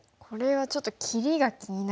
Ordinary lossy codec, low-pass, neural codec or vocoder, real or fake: none; none; none; real